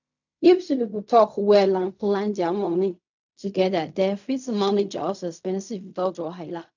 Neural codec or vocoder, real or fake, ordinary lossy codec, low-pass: codec, 16 kHz in and 24 kHz out, 0.4 kbps, LongCat-Audio-Codec, fine tuned four codebook decoder; fake; none; 7.2 kHz